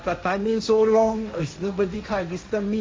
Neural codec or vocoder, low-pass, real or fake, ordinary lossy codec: codec, 16 kHz, 1.1 kbps, Voila-Tokenizer; none; fake; none